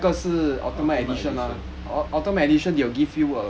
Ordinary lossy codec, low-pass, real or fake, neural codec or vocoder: none; none; real; none